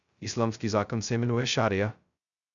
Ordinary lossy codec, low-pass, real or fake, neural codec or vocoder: Opus, 64 kbps; 7.2 kHz; fake; codec, 16 kHz, 0.2 kbps, FocalCodec